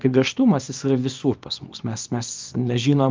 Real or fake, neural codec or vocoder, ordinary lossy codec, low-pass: fake; codec, 24 kHz, 0.9 kbps, WavTokenizer, small release; Opus, 24 kbps; 7.2 kHz